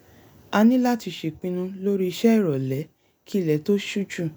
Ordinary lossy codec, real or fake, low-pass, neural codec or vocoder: none; real; none; none